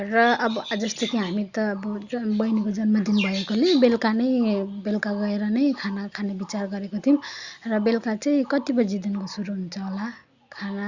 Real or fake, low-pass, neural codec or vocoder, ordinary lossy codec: real; 7.2 kHz; none; Opus, 64 kbps